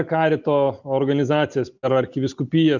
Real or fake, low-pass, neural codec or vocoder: real; 7.2 kHz; none